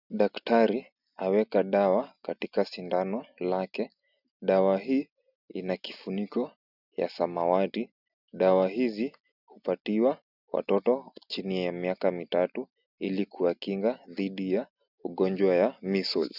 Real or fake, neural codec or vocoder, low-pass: real; none; 5.4 kHz